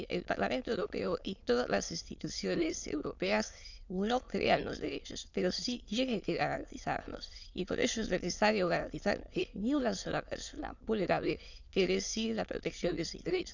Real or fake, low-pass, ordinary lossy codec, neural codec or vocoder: fake; 7.2 kHz; none; autoencoder, 22.05 kHz, a latent of 192 numbers a frame, VITS, trained on many speakers